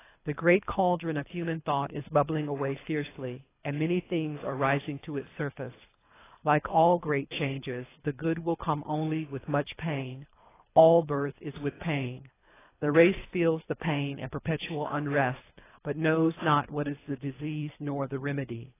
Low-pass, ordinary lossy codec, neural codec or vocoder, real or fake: 3.6 kHz; AAC, 16 kbps; codec, 24 kHz, 3 kbps, HILCodec; fake